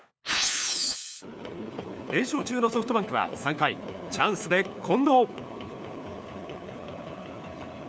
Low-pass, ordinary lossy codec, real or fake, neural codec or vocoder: none; none; fake; codec, 16 kHz, 4 kbps, FunCodec, trained on LibriTTS, 50 frames a second